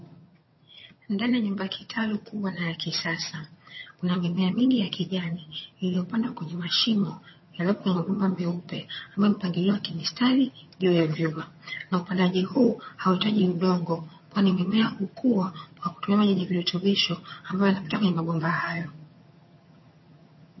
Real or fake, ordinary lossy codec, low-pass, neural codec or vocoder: fake; MP3, 24 kbps; 7.2 kHz; vocoder, 22.05 kHz, 80 mel bands, HiFi-GAN